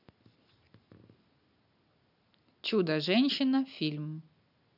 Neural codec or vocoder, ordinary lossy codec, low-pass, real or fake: none; none; 5.4 kHz; real